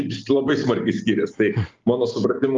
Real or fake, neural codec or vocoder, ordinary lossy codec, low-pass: real; none; Opus, 32 kbps; 7.2 kHz